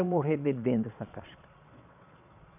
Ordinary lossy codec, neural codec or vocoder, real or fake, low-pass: none; vocoder, 22.05 kHz, 80 mel bands, Vocos; fake; 3.6 kHz